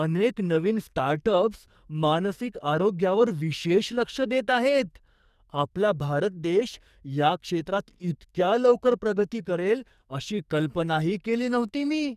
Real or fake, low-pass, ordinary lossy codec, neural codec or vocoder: fake; 14.4 kHz; none; codec, 44.1 kHz, 2.6 kbps, SNAC